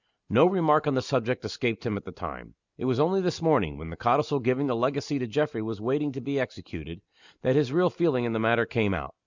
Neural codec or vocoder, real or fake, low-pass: none; real; 7.2 kHz